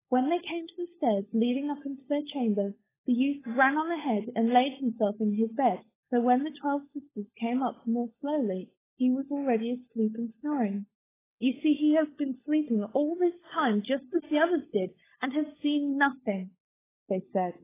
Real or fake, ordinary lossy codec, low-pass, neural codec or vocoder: fake; AAC, 16 kbps; 3.6 kHz; codec, 16 kHz, 16 kbps, FunCodec, trained on LibriTTS, 50 frames a second